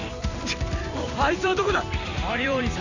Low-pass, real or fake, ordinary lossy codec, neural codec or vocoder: 7.2 kHz; fake; none; vocoder, 44.1 kHz, 128 mel bands every 256 samples, BigVGAN v2